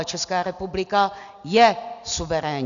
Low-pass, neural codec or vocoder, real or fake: 7.2 kHz; none; real